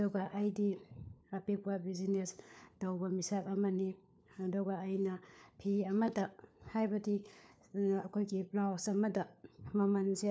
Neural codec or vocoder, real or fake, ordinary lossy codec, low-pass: codec, 16 kHz, 4 kbps, FunCodec, trained on LibriTTS, 50 frames a second; fake; none; none